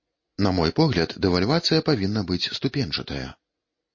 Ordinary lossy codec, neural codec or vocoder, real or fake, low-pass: MP3, 32 kbps; none; real; 7.2 kHz